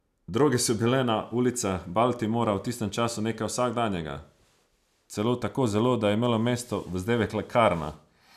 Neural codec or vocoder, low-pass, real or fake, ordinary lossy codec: none; 14.4 kHz; real; none